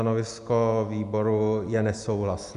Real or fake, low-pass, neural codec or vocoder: real; 10.8 kHz; none